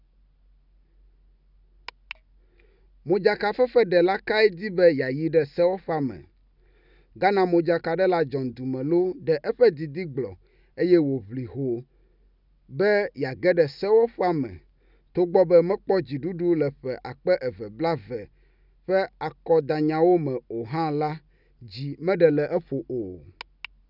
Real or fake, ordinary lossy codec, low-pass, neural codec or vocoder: real; none; 5.4 kHz; none